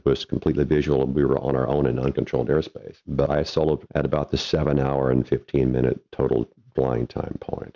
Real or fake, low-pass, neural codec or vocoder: real; 7.2 kHz; none